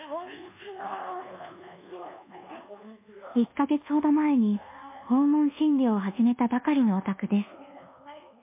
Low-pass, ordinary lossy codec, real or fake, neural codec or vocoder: 3.6 kHz; MP3, 24 kbps; fake; codec, 24 kHz, 1.2 kbps, DualCodec